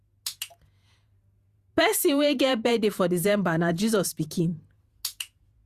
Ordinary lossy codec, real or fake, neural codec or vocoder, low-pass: Opus, 64 kbps; fake; vocoder, 48 kHz, 128 mel bands, Vocos; 14.4 kHz